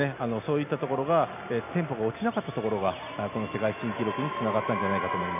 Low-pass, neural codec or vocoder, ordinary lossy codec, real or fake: 3.6 kHz; none; none; real